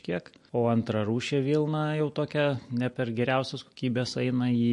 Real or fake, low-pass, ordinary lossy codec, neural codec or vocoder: real; 10.8 kHz; MP3, 48 kbps; none